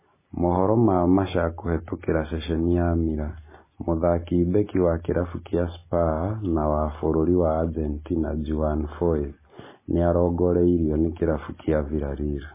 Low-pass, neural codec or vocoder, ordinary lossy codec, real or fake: 3.6 kHz; none; MP3, 16 kbps; real